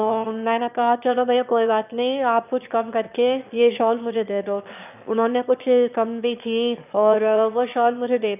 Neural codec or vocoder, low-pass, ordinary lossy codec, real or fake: autoencoder, 22.05 kHz, a latent of 192 numbers a frame, VITS, trained on one speaker; 3.6 kHz; none; fake